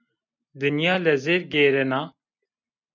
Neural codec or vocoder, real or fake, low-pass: none; real; 7.2 kHz